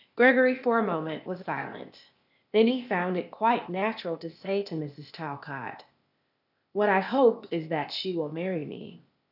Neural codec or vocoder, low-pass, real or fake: codec, 16 kHz, 0.8 kbps, ZipCodec; 5.4 kHz; fake